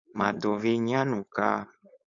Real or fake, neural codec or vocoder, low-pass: fake; codec, 16 kHz, 4.8 kbps, FACodec; 7.2 kHz